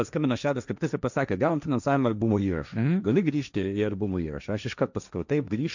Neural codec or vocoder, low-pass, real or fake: codec, 16 kHz, 1.1 kbps, Voila-Tokenizer; 7.2 kHz; fake